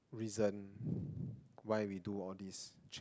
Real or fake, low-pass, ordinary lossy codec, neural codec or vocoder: real; none; none; none